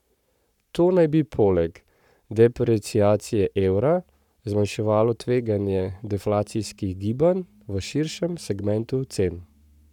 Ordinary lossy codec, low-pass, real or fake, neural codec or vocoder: none; 19.8 kHz; fake; codec, 44.1 kHz, 7.8 kbps, Pupu-Codec